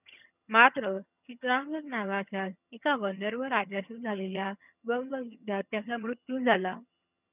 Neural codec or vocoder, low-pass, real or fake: vocoder, 22.05 kHz, 80 mel bands, HiFi-GAN; 3.6 kHz; fake